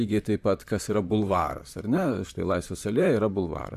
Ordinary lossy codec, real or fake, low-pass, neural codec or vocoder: AAC, 96 kbps; fake; 14.4 kHz; vocoder, 44.1 kHz, 128 mel bands, Pupu-Vocoder